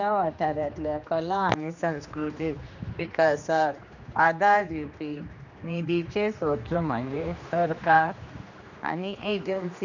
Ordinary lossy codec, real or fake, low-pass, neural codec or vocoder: none; fake; 7.2 kHz; codec, 16 kHz, 2 kbps, X-Codec, HuBERT features, trained on general audio